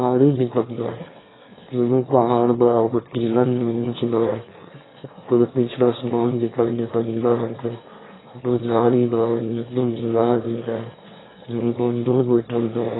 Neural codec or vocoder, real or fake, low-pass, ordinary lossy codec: autoencoder, 22.05 kHz, a latent of 192 numbers a frame, VITS, trained on one speaker; fake; 7.2 kHz; AAC, 16 kbps